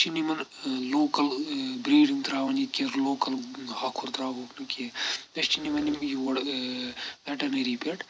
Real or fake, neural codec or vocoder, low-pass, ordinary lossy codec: real; none; none; none